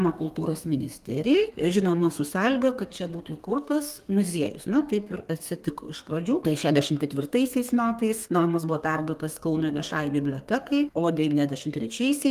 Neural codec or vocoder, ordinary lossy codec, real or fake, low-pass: codec, 32 kHz, 1.9 kbps, SNAC; Opus, 32 kbps; fake; 14.4 kHz